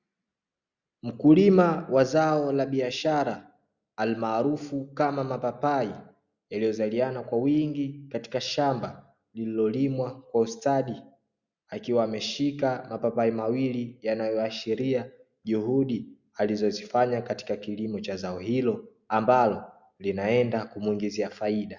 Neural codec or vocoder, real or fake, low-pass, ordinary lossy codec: none; real; 7.2 kHz; Opus, 64 kbps